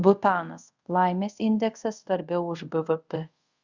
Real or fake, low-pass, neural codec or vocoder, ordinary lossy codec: fake; 7.2 kHz; codec, 24 kHz, 0.5 kbps, DualCodec; Opus, 64 kbps